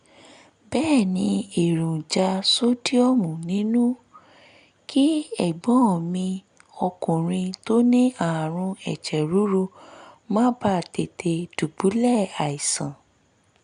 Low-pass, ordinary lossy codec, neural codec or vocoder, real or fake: 9.9 kHz; none; none; real